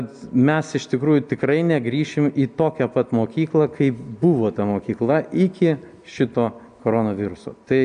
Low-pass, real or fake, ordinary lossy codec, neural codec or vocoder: 9.9 kHz; real; AAC, 96 kbps; none